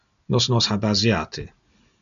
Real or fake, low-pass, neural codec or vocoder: real; 7.2 kHz; none